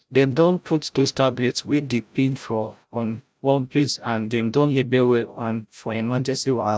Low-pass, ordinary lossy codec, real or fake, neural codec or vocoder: none; none; fake; codec, 16 kHz, 0.5 kbps, FreqCodec, larger model